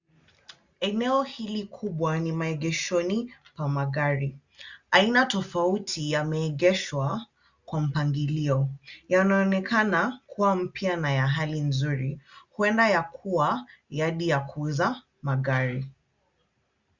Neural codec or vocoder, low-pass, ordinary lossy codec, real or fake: none; 7.2 kHz; Opus, 64 kbps; real